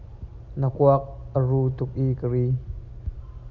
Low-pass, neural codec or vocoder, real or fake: 7.2 kHz; none; real